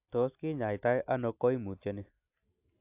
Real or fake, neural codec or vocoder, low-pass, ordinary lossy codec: real; none; 3.6 kHz; AAC, 24 kbps